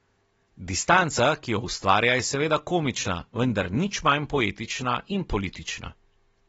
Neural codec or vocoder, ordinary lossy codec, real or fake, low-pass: none; AAC, 24 kbps; real; 10.8 kHz